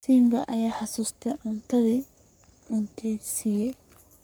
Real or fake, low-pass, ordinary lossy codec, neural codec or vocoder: fake; none; none; codec, 44.1 kHz, 3.4 kbps, Pupu-Codec